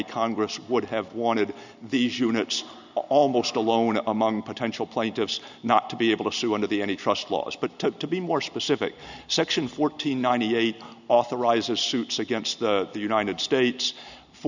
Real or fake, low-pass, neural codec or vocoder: real; 7.2 kHz; none